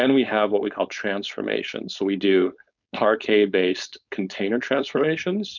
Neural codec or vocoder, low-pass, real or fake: codec, 16 kHz, 4.8 kbps, FACodec; 7.2 kHz; fake